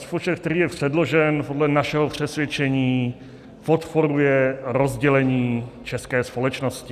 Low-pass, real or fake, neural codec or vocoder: 14.4 kHz; real; none